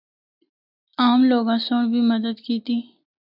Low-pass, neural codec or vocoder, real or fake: 5.4 kHz; none; real